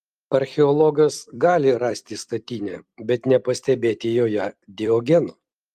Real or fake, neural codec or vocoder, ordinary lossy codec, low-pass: fake; vocoder, 44.1 kHz, 128 mel bands every 256 samples, BigVGAN v2; Opus, 32 kbps; 14.4 kHz